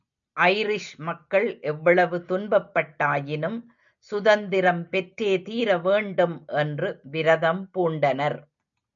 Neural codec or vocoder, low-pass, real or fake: none; 7.2 kHz; real